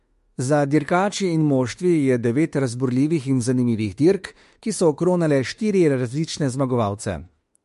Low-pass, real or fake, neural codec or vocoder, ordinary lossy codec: 14.4 kHz; fake; autoencoder, 48 kHz, 32 numbers a frame, DAC-VAE, trained on Japanese speech; MP3, 48 kbps